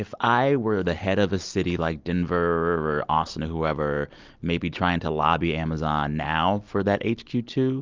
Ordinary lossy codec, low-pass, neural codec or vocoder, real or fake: Opus, 24 kbps; 7.2 kHz; none; real